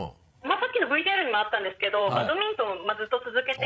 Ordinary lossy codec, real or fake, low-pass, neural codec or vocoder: none; fake; none; codec, 16 kHz, 16 kbps, FreqCodec, larger model